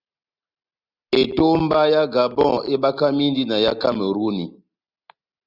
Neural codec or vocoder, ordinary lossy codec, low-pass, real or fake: none; Opus, 64 kbps; 5.4 kHz; real